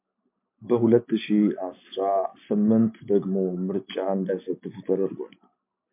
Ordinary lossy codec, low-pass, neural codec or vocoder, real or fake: MP3, 32 kbps; 3.6 kHz; none; real